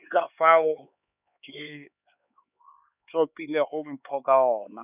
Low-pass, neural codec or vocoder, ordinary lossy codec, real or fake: 3.6 kHz; codec, 16 kHz, 4 kbps, X-Codec, HuBERT features, trained on LibriSpeech; none; fake